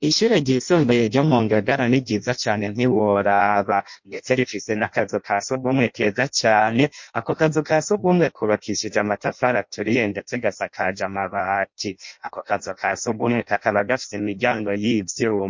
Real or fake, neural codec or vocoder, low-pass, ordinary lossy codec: fake; codec, 16 kHz in and 24 kHz out, 0.6 kbps, FireRedTTS-2 codec; 7.2 kHz; MP3, 48 kbps